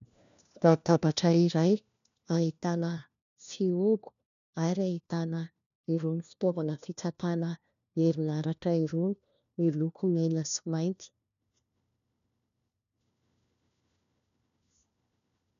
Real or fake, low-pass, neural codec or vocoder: fake; 7.2 kHz; codec, 16 kHz, 1 kbps, FunCodec, trained on LibriTTS, 50 frames a second